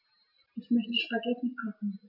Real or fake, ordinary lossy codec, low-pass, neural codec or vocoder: real; none; 5.4 kHz; none